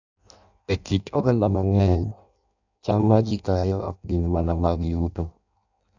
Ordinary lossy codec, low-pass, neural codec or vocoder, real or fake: none; 7.2 kHz; codec, 16 kHz in and 24 kHz out, 0.6 kbps, FireRedTTS-2 codec; fake